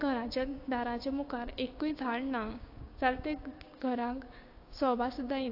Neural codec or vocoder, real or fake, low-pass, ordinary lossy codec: codec, 16 kHz, 6 kbps, DAC; fake; 5.4 kHz; AAC, 32 kbps